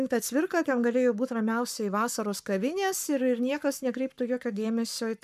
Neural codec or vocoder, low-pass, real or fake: codec, 44.1 kHz, 7.8 kbps, Pupu-Codec; 14.4 kHz; fake